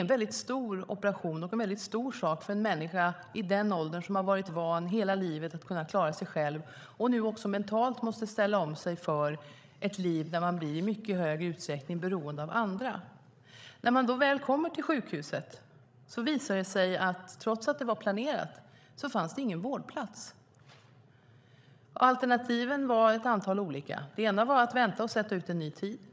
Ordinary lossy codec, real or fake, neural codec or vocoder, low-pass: none; fake; codec, 16 kHz, 16 kbps, FreqCodec, larger model; none